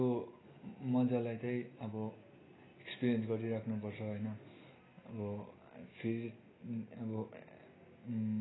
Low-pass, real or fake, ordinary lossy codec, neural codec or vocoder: 7.2 kHz; real; AAC, 16 kbps; none